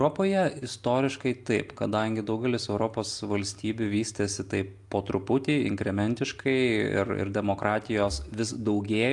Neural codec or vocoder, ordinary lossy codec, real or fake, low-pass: none; AAC, 64 kbps; real; 10.8 kHz